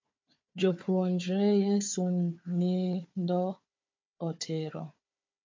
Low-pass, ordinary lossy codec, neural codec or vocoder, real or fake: 7.2 kHz; MP3, 48 kbps; codec, 16 kHz, 4 kbps, FunCodec, trained on Chinese and English, 50 frames a second; fake